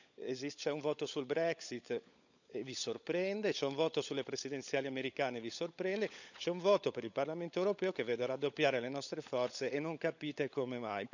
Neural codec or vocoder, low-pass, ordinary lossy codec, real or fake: codec, 16 kHz, 16 kbps, FunCodec, trained on LibriTTS, 50 frames a second; 7.2 kHz; none; fake